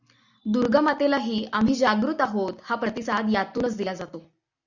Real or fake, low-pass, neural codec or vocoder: real; 7.2 kHz; none